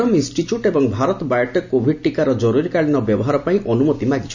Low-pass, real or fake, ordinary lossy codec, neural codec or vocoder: 7.2 kHz; real; none; none